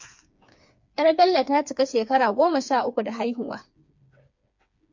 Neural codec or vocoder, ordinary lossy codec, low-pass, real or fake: codec, 16 kHz, 4 kbps, FreqCodec, smaller model; MP3, 48 kbps; 7.2 kHz; fake